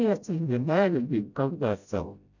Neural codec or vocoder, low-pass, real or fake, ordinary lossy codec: codec, 16 kHz, 0.5 kbps, FreqCodec, smaller model; 7.2 kHz; fake; none